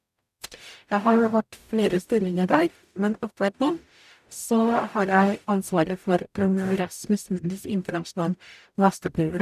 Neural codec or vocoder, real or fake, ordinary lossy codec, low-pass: codec, 44.1 kHz, 0.9 kbps, DAC; fake; none; 14.4 kHz